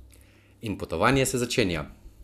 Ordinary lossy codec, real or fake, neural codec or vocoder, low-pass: none; real; none; 14.4 kHz